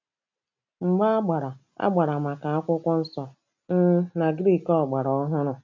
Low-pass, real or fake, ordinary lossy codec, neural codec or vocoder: 7.2 kHz; real; MP3, 64 kbps; none